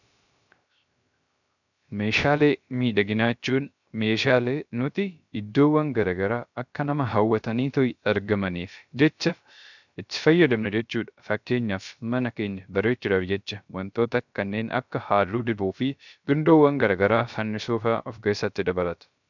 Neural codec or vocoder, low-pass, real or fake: codec, 16 kHz, 0.3 kbps, FocalCodec; 7.2 kHz; fake